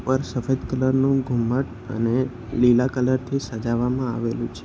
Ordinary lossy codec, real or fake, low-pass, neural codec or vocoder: none; real; none; none